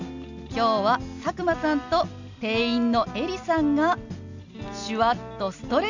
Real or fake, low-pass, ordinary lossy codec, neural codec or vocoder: real; 7.2 kHz; none; none